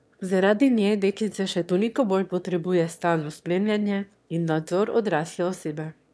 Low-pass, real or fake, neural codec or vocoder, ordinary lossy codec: none; fake; autoencoder, 22.05 kHz, a latent of 192 numbers a frame, VITS, trained on one speaker; none